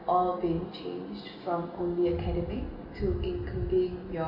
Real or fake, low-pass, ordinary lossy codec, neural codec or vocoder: real; 5.4 kHz; Opus, 64 kbps; none